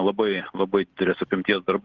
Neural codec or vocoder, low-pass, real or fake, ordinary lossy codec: none; 7.2 kHz; real; Opus, 16 kbps